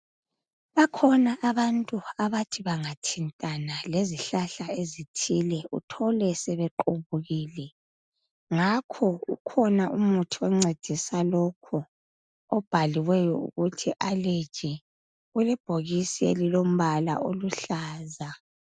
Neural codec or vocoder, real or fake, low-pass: none; real; 9.9 kHz